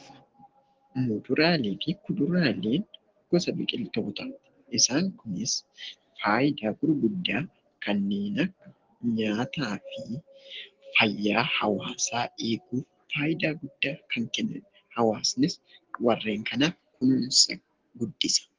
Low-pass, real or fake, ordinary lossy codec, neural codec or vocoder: 7.2 kHz; real; Opus, 16 kbps; none